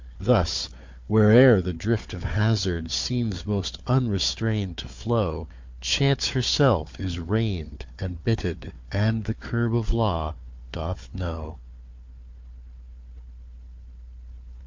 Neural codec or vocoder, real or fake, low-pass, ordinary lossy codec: codec, 16 kHz, 4 kbps, FunCodec, trained on Chinese and English, 50 frames a second; fake; 7.2 kHz; MP3, 48 kbps